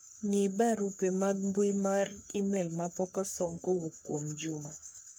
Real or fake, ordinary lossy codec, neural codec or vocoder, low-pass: fake; none; codec, 44.1 kHz, 3.4 kbps, Pupu-Codec; none